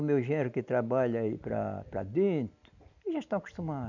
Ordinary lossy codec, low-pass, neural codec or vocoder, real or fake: none; 7.2 kHz; none; real